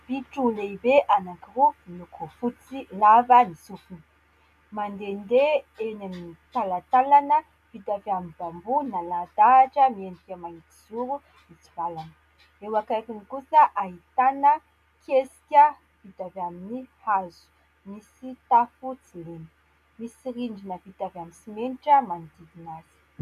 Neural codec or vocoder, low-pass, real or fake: none; 14.4 kHz; real